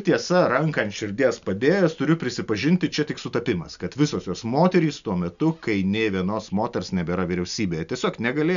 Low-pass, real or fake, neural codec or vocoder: 7.2 kHz; real; none